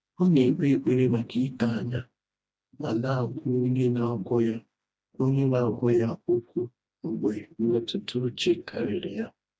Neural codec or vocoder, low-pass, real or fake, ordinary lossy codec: codec, 16 kHz, 1 kbps, FreqCodec, smaller model; none; fake; none